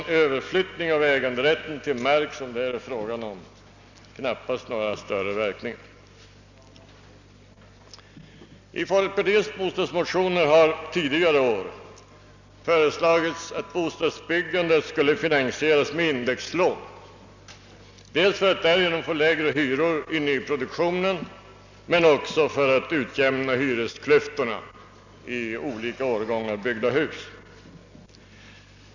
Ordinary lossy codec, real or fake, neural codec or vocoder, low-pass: none; real; none; 7.2 kHz